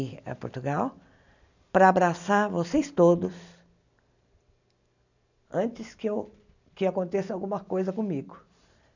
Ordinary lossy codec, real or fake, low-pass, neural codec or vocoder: none; real; 7.2 kHz; none